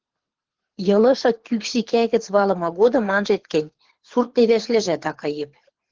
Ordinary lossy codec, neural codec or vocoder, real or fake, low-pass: Opus, 16 kbps; codec, 24 kHz, 6 kbps, HILCodec; fake; 7.2 kHz